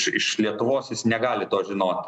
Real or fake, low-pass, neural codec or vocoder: real; 10.8 kHz; none